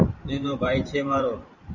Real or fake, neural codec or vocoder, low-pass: fake; vocoder, 44.1 kHz, 128 mel bands every 512 samples, BigVGAN v2; 7.2 kHz